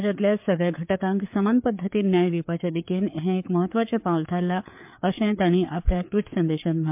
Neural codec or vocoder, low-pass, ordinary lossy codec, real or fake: codec, 16 kHz, 4 kbps, FreqCodec, larger model; 3.6 kHz; MP3, 32 kbps; fake